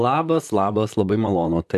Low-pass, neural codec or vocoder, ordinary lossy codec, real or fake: 14.4 kHz; vocoder, 44.1 kHz, 128 mel bands, Pupu-Vocoder; MP3, 96 kbps; fake